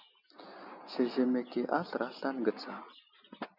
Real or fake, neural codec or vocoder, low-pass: real; none; 5.4 kHz